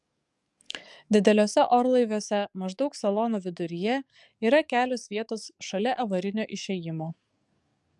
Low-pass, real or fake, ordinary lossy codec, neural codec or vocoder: 10.8 kHz; fake; MP3, 96 kbps; codec, 44.1 kHz, 7.8 kbps, DAC